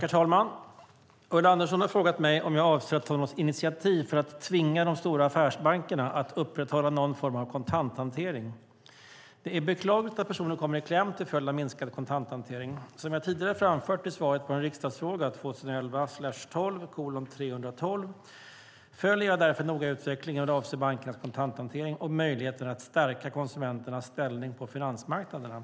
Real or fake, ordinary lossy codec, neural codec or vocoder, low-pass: real; none; none; none